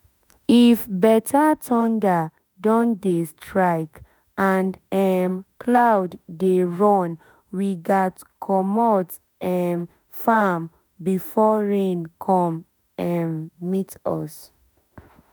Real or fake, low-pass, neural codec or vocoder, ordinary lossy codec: fake; none; autoencoder, 48 kHz, 32 numbers a frame, DAC-VAE, trained on Japanese speech; none